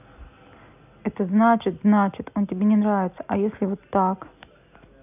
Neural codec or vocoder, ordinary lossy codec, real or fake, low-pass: none; none; real; 3.6 kHz